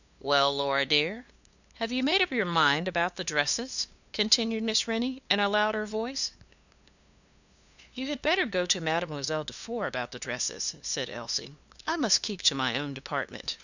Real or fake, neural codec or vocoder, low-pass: fake; codec, 16 kHz, 2 kbps, FunCodec, trained on LibriTTS, 25 frames a second; 7.2 kHz